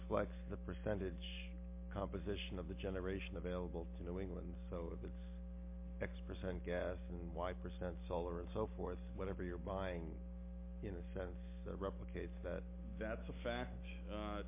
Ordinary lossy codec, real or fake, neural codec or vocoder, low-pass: MP3, 24 kbps; real; none; 3.6 kHz